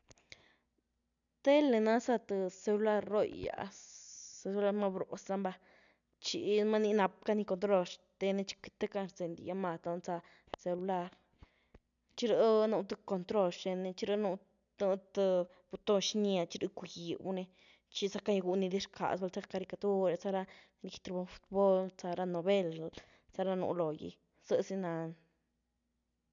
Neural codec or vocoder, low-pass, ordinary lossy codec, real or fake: none; 7.2 kHz; none; real